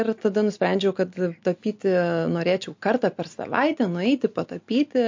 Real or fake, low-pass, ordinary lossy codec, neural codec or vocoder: real; 7.2 kHz; MP3, 48 kbps; none